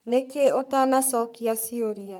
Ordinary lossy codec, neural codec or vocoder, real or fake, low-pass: none; codec, 44.1 kHz, 3.4 kbps, Pupu-Codec; fake; none